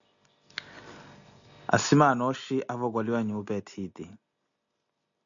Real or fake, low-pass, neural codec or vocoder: real; 7.2 kHz; none